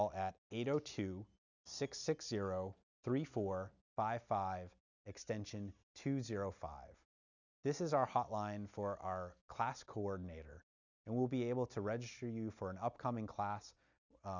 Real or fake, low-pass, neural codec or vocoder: real; 7.2 kHz; none